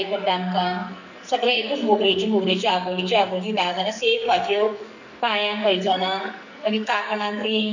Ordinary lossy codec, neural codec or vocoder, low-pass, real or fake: none; codec, 44.1 kHz, 2.6 kbps, SNAC; 7.2 kHz; fake